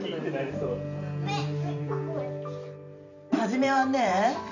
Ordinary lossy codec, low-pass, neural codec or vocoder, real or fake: none; 7.2 kHz; vocoder, 44.1 kHz, 128 mel bands every 512 samples, BigVGAN v2; fake